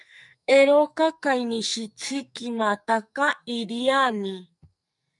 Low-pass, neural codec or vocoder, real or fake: 10.8 kHz; codec, 44.1 kHz, 2.6 kbps, SNAC; fake